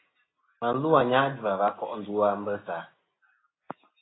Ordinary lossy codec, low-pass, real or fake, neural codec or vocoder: AAC, 16 kbps; 7.2 kHz; real; none